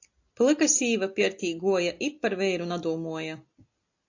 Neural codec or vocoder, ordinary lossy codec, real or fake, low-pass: none; AAC, 48 kbps; real; 7.2 kHz